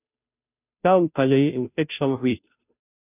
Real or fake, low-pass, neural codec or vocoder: fake; 3.6 kHz; codec, 16 kHz, 0.5 kbps, FunCodec, trained on Chinese and English, 25 frames a second